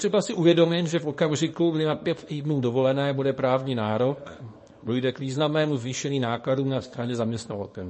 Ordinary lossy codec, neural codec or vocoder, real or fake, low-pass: MP3, 32 kbps; codec, 24 kHz, 0.9 kbps, WavTokenizer, small release; fake; 10.8 kHz